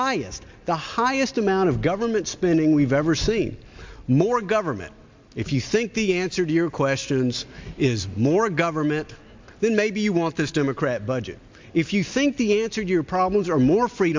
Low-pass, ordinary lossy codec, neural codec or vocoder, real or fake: 7.2 kHz; MP3, 64 kbps; none; real